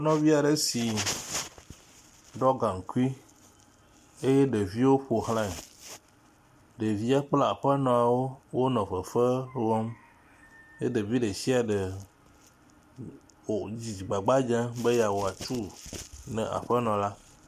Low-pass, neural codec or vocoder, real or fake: 14.4 kHz; none; real